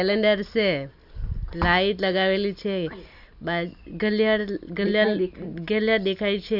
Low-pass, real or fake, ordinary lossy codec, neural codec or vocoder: 5.4 kHz; fake; none; vocoder, 44.1 kHz, 128 mel bands every 256 samples, BigVGAN v2